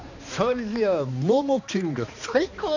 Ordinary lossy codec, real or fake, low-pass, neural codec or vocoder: none; fake; 7.2 kHz; codec, 16 kHz, 2 kbps, X-Codec, HuBERT features, trained on balanced general audio